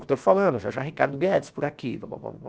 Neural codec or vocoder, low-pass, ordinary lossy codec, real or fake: codec, 16 kHz, about 1 kbps, DyCAST, with the encoder's durations; none; none; fake